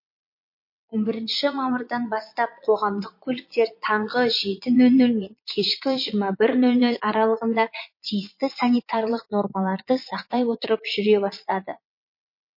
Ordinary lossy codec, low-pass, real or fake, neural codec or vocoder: MP3, 32 kbps; 5.4 kHz; fake; vocoder, 22.05 kHz, 80 mel bands, Vocos